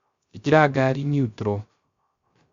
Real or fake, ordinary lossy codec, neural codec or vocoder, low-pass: fake; Opus, 64 kbps; codec, 16 kHz, 0.3 kbps, FocalCodec; 7.2 kHz